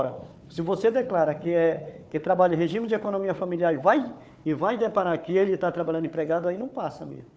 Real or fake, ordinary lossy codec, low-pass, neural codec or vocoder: fake; none; none; codec, 16 kHz, 4 kbps, FunCodec, trained on Chinese and English, 50 frames a second